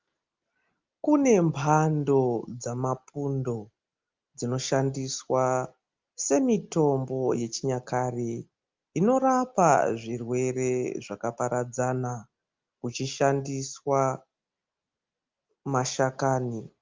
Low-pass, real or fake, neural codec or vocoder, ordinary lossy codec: 7.2 kHz; real; none; Opus, 24 kbps